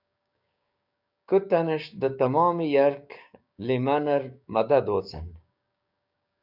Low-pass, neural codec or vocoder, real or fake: 5.4 kHz; codec, 16 kHz, 6 kbps, DAC; fake